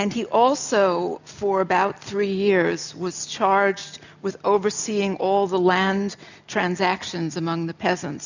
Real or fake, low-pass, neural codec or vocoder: real; 7.2 kHz; none